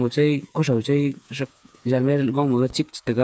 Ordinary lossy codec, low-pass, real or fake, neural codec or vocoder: none; none; fake; codec, 16 kHz, 4 kbps, FreqCodec, smaller model